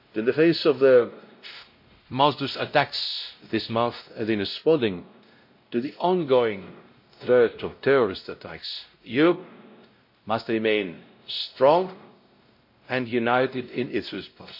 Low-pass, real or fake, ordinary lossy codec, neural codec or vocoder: 5.4 kHz; fake; MP3, 32 kbps; codec, 16 kHz, 0.5 kbps, X-Codec, WavLM features, trained on Multilingual LibriSpeech